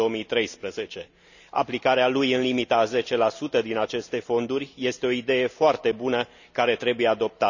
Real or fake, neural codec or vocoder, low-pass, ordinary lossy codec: real; none; 7.2 kHz; none